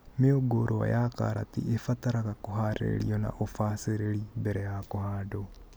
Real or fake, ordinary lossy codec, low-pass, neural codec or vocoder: real; none; none; none